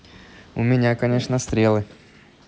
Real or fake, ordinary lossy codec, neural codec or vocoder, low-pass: real; none; none; none